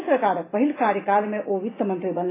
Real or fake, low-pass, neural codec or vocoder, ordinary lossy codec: real; 3.6 kHz; none; AAC, 16 kbps